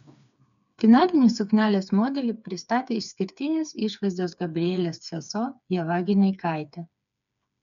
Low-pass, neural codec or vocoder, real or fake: 7.2 kHz; codec, 16 kHz, 4 kbps, FreqCodec, smaller model; fake